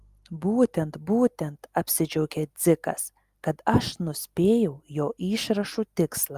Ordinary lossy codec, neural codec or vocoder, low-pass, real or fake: Opus, 32 kbps; none; 14.4 kHz; real